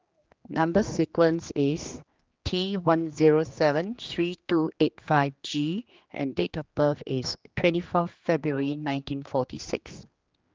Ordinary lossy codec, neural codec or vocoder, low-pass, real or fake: Opus, 32 kbps; codec, 16 kHz, 2 kbps, X-Codec, HuBERT features, trained on general audio; 7.2 kHz; fake